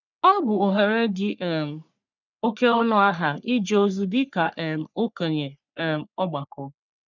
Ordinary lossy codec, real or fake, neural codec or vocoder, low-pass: none; fake; codec, 44.1 kHz, 3.4 kbps, Pupu-Codec; 7.2 kHz